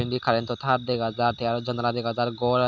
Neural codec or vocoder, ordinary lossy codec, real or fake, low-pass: none; none; real; none